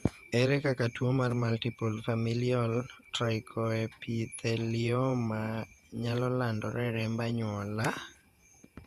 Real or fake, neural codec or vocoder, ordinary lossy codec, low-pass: fake; vocoder, 48 kHz, 128 mel bands, Vocos; Opus, 64 kbps; 14.4 kHz